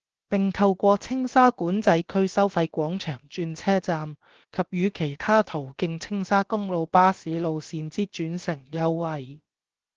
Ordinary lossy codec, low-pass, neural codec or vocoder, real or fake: Opus, 16 kbps; 7.2 kHz; codec, 16 kHz, about 1 kbps, DyCAST, with the encoder's durations; fake